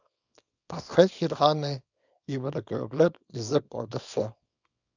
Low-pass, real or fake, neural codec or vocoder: 7.2 kHz; fake; codec, 24 kHz, 0.9 kbps, WavTokenizer, small release